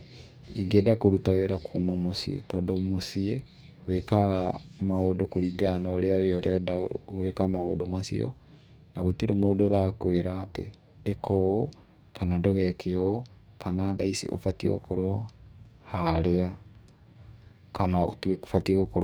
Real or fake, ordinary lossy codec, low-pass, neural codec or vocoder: fake; none; none; codec, 44.1 kHz, 2.6 kbps, DAC